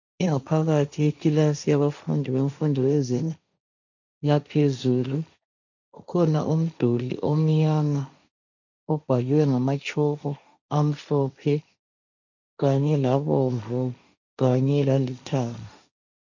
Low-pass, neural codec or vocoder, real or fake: 7.2 kHz; codec, 16 kHz, 1.1 kbps, Voila-Tokenizer; fake